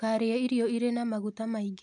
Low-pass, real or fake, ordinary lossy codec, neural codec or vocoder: 9.9 kHz; real; MP3, 64 kbps; none